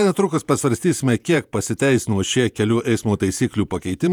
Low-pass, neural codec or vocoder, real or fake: 19.8 kHz; vocoder, 48 kHz, 128 mel bands, Vocos; fake